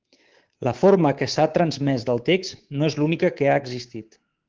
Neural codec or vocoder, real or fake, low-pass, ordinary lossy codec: codec, 24 kHz, 3.1 kbps, DualCodec; fake; 7.2 kHz; Opus, 16 kbps